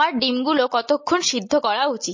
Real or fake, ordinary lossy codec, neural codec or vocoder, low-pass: real; MP3, 32 kbps; none; 7.2 kHz